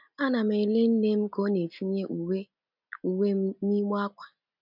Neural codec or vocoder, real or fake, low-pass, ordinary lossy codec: none; real; 5.4 kHz; none